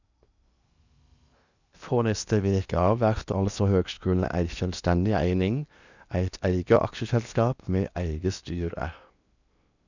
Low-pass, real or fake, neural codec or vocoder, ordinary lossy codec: 7.2 kHz; fake; codec, 16 kHz in and 24 kHz out, 0.8 kbps, FocalCodec, streaming, 65536 codes; none